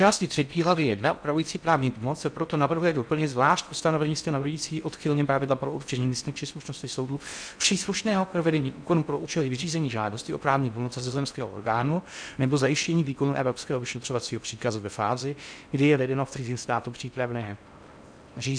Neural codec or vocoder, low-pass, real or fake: codec, 16 kHz in and 24 kHz out, 0.6 kbps, FocalCodec, streaming, 4096 codes; 9.9 kHz; fake